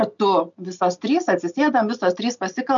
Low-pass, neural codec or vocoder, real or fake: 7.2 kHz; none; real